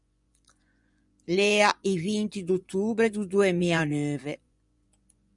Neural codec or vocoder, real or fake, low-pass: vocoder, 24 kHz, 100 mel bands, Vocos; fake; 10.8 kHz